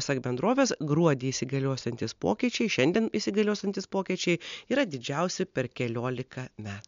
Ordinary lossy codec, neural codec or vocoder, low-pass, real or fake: MP3, 64 kbps; none; 7.2 kHz; real